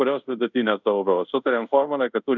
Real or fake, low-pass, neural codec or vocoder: fake; 7.2 kHz; codec, 24 kHz, 0.5 kbps, DualCodec